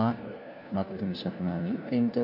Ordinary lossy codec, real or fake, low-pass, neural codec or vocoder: none; fake; 5.4 kHz; codec, 16 kHz, 1 kbps, FunCodec, trained on LibriTTS, 50 frames a second